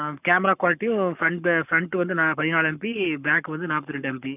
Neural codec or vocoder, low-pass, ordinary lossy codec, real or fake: codec, 44.1 kHz, 7.8 kbps, Pupu-Codec; 3.6 kHz; none; fake